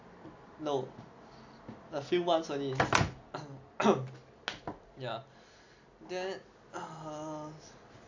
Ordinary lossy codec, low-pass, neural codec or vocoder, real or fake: AAC, 48 kbps; 7.2 kHz; none; real